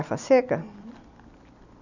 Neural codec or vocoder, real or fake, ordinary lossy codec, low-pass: none; real; none; 7.2 kHz